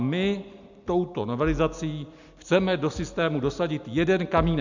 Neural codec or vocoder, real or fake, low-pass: none; real; 7.2 kHz